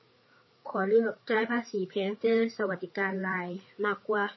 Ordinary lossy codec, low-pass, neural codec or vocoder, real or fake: MP3, 24 kbps; 7.2 kHz; codec, 16 kHz, 4 kbps, FreqCodec, larger model; fake